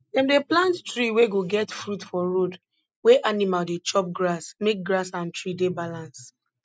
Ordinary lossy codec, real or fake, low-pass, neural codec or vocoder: none; real; none; none